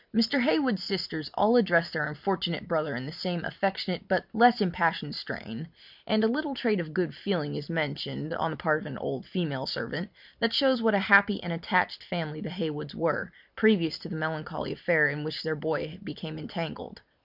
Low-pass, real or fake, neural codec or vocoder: 5.4 kHz; real; none